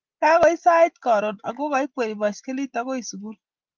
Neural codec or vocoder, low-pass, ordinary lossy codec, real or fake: none; 7.2 kHz; Opus, 24 kbps; real